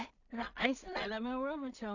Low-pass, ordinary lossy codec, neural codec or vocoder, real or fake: 7.2 kHz; none; codec, 16 kHz in and 24 kHz out, 0.4 kbps, LongCat-Audio-Codec, two codebook decoder; fake